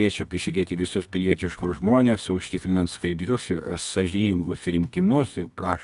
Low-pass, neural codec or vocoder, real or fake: 10.8 kHz; codec, 24 kHz, 0.9 kbps, WavTokenizer, medium music audio release; fake